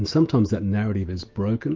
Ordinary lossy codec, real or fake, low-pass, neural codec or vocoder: Opus, 24 kbps; fake; 7.2 kHz; autoencoder, 48 kHz, 128 numbers a frame, DAC-VAE, trained on Japanese speech